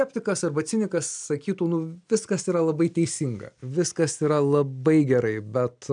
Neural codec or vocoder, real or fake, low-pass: none; real; 9.9 kHz